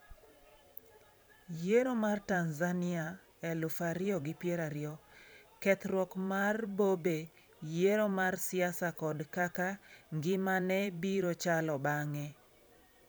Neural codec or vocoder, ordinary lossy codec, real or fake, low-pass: vocoder, 44.1 kHz, 128 mel bands every 512 samples, BigVGAN v2; none; fake; none